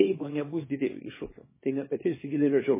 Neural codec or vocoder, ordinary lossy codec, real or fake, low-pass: codec, 24 kHz, 0.9 kbps, WavTokenizer, small release; MP3, 16 kbps; fake; 3.6 kHz